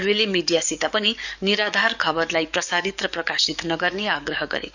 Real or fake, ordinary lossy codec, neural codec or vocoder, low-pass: fake; none; codec, 44.1 kHz, 7.8 kbps, DAC; 7.2 kHz